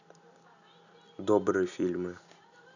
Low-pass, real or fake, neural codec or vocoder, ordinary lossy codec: 7.2 kHz; real; none; none